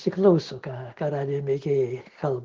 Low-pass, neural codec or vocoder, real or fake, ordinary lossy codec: 7.2 kHz; none; real; Opus, 16 kbps